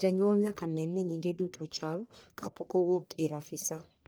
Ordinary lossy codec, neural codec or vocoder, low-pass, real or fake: none; codec, 44.1 kHz, 1.7 kbps, Pupu-Codec; none; fake